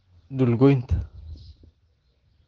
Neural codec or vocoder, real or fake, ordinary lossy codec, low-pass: none; real; Opus, 16 kbps; 7.2 kHz